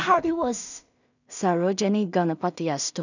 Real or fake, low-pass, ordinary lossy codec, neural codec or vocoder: fake; 7.2 kHz; none; codec, 16 kHz in and 24 kHz out, 0.4 kbps, LongCat-Audio-Codec, two codebook decoder